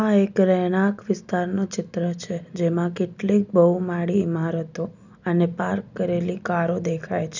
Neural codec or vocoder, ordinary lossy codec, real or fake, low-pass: none; none; real; 7.2 kHz